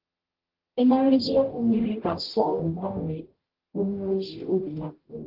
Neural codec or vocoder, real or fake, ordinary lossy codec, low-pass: codec, 44.1 kHz, 0.9 kbps, DAC; fake; Opus, 16 kbps; 5.4 kHz